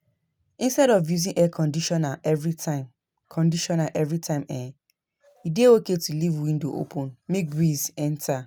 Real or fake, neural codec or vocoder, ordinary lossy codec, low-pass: real; none; none; none